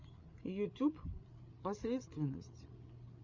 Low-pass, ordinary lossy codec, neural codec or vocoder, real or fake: 7.2 kHz; Opus, 64 kbps; codec, 16 kHz, 8 kbps, FreqCodec, larger model; fake